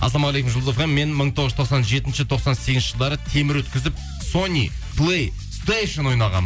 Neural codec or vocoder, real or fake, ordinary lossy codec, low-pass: none; real; none; none